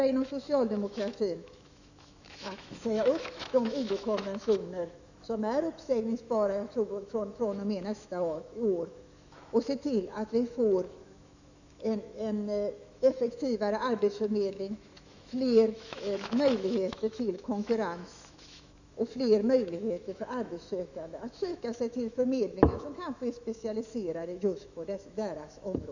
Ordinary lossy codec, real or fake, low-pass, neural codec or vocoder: none; fake; 7.2 kHz; autoencoder, 48 kHz, 128 numbers a frame, DAC-VAE, trained on Japanese speech